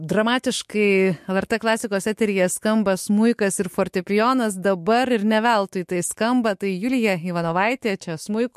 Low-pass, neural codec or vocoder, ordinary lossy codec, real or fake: 14.4 kHz; autoencoder, 48 kHz, 128 numbers a frame, DAC-VAE, trained on Japanese speech; MP3, 64 kbps; fake